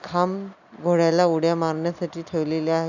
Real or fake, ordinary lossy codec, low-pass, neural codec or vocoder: real; none; 7.2 kHz; none